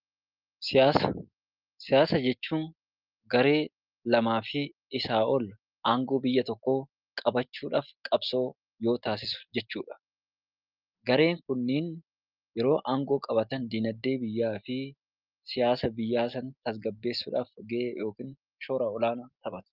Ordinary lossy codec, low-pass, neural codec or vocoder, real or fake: Opus, 24 kbps; 5.4 kHz; none; real